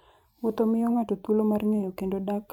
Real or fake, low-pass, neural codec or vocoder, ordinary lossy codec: fake; 19.8 kHz; vocoder, 44.1 kHz, 128 mel bands every 512 samples, BigVGAN v2; none